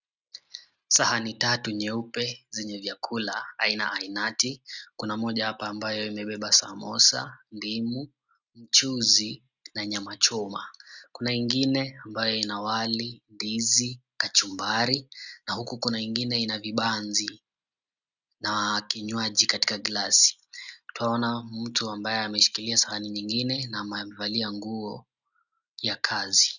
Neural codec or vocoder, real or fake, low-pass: none; real; 7.2 kHz